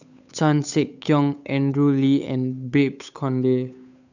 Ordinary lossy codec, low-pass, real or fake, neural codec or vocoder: none; 7.2 kHz; fake; codec, 16 kHz, 8 kbps, FunCodec, trained on Chinese and English, 25 frames a second